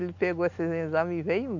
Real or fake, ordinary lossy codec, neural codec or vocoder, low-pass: real; none; none; 7.2 kHz